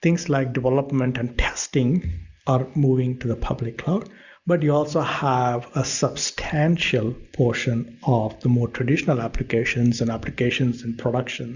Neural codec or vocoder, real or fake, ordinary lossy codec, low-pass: none; real; Opus, 64 kbps; 7.2 kHz